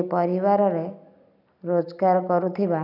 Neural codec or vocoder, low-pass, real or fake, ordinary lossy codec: none; 5.4 kHz; real; none